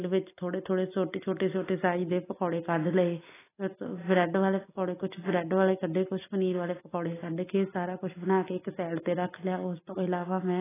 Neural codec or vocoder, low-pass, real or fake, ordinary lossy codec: none; 3.6 kHz; real; AAC, 16 kbps